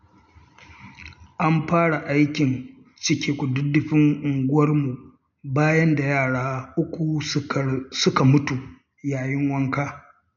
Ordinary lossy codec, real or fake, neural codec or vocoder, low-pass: none; real; none; 7.2 kHz